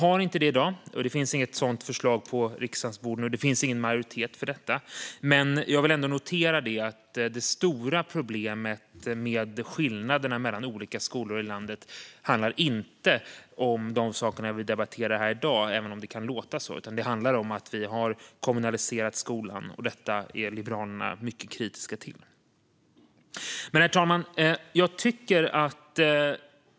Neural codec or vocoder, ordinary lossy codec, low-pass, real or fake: none; none; none; real